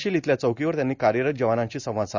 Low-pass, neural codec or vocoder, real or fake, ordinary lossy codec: 7.2 kHz; none; real; Opus, 64 kbps